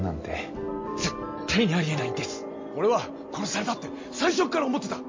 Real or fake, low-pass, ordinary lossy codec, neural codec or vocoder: real; 7.2 kHz; MP3, 32 kbps; none